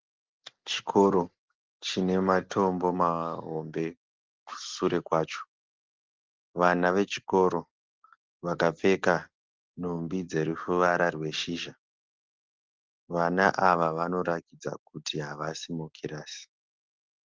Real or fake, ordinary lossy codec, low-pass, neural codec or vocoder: real; Opus, 16 kbps; 7.2 kHz; none